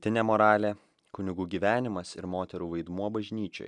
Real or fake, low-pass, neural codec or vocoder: real; 10.8 kHz; none